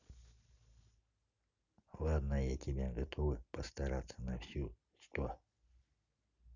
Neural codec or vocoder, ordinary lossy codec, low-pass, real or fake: none; none; 7.2 kHz; real